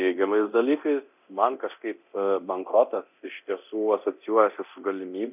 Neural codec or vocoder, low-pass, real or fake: codec, 24 kHz, 0.9 kbps, DualCodec; 3.6 kHz; fake